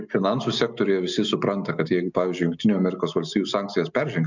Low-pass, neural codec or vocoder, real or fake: 7.2 kHz; none; real